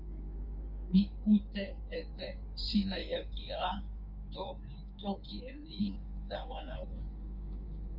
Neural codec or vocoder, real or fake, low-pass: codec, 16 kHz in and 24 kHz out, 1.1 kbps, FireRedTTS-2 codec; fake; 5.4 kHz